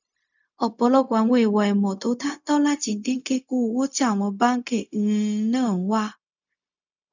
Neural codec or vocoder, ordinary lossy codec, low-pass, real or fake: codec, 16 kHz, 0.4 kbps, LongCat-Audio-Codec; none; 7.2 kHz; fake